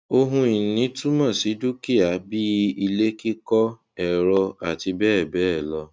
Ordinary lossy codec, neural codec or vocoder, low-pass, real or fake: none; none; none; real